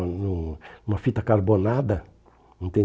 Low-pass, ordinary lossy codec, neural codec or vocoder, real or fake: none; none; none; real